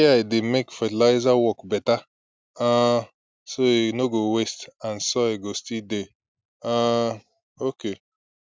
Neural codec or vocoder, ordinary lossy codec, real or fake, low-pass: none; none; real; none